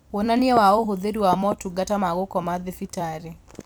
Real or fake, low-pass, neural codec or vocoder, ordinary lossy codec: fake; none; vocoder, 44.1 kHz, 128 mel bands every 256 samples, BigVGAN v2; none